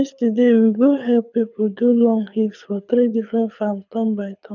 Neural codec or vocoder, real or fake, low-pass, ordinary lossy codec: codec, 24 kHz, 6 kbps, HILCodec; fake; 7.2 kHz; none